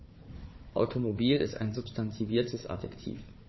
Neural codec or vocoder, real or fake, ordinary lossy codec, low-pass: codec, 16 kHz, 4 kbps, FunCodec, trained on Chinese and English, 50 frames a second; fake; MP3, 24 kbps; 7.2 kHz